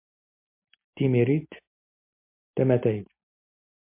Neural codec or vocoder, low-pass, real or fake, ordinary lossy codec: none; 3.6 kHz; real; MP3, 32 kbps